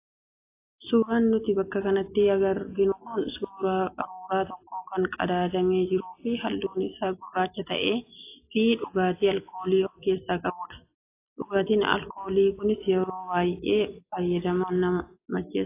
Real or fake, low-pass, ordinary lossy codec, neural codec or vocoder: real; 3.6 kHz; AAC, 24 kbps; none